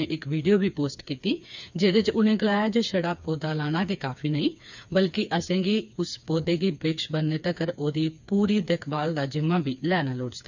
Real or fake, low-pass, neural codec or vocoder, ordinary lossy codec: fake; 7.2 kHz; codec, 16 kHz, 4 kbps, FreqCodec, smaller model; none